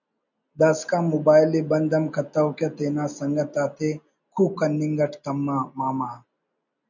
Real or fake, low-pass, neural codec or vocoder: real; 7.2 kHz; none